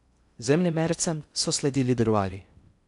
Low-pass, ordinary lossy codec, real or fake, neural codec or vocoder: 10.8 kHz; none; fake; codec, 16 kHz in and 24 kHz out, 0.6 kbps, FocalCodec, streaming, 2048 codes